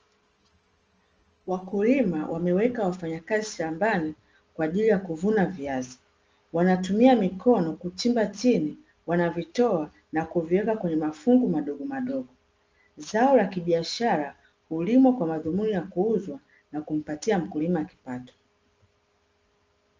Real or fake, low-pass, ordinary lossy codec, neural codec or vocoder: real; 7.2 kHz; Opus, 24 kbps; none